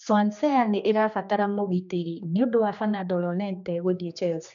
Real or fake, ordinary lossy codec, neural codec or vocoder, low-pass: fake; none; codec, 16 kHz, 2 kbps, X-Codec, HuBERT features, trained on general audio; 7.2 kHz